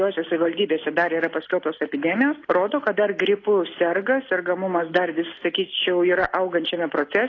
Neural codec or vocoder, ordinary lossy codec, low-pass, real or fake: none; AAC, 32 kbps; 7.2 kHz; real